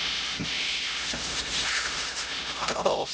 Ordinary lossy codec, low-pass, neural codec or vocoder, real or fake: none; none; codec, 16 kHz, 0.5 kbps, X-Codec, HuBERT features, trained on LibriSpeech; fake